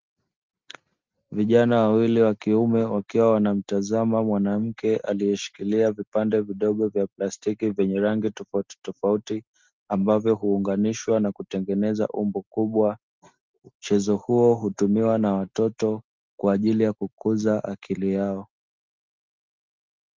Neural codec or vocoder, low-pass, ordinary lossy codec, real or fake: none; 7.2 kHz; Opus, 24 kbps; real